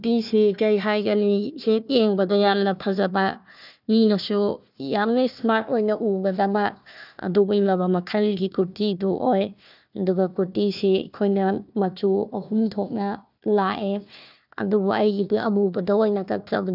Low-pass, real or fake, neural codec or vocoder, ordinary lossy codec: 5.4 kHz; fake; codec, 16 kHz, 1 kbps, FunCodec, trained on Chinese and English, 50 frames a second; none